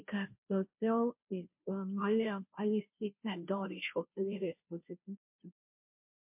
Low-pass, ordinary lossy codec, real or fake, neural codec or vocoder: 3.6 kHz; MP3, 32 kbps; fake; codec, 16 kHz, 0.5 kbps, FunCodec, trained on Chinese and English, 25 frames a second